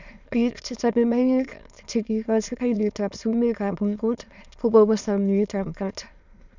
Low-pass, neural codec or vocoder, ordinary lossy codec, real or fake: 7.2 kHz; autoencoder, 22.05 kHz, a latent of 192 numbers a frame, VITS, trained on many speakers; none; fake